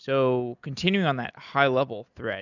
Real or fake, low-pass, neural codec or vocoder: real; 7.2 kHz; none